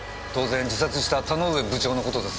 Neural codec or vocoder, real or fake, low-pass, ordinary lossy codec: none; real; none; none